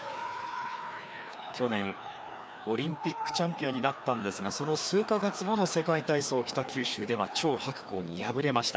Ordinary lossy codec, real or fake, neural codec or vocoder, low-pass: none; fake; codec, 16 kHz, 2 kbps, FreqCodec, larger model; none